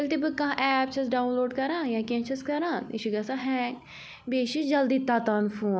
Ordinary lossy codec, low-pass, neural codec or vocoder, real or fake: none; none; none; real